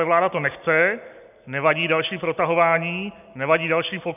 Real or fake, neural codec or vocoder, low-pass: real; none; 3.6 kHz